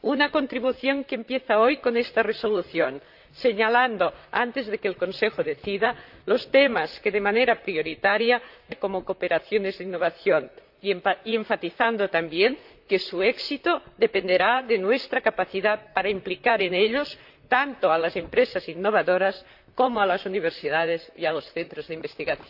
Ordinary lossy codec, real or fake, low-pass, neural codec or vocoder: AAC, 48 kbps; fake; 5.4 kHz; vocoder, 44.1 kHz, 128 mel bands, Pupu-Vocoder